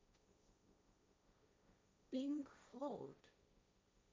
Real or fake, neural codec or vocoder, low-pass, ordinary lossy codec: fake; codec, 16 kHz, 1.1 kbps, Voila-Tokenizer; none; none